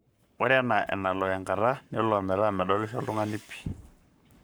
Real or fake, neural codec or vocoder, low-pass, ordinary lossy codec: fake; codec, 44.1 kHz, 7.8 kbps, Pupu-Codec; none; none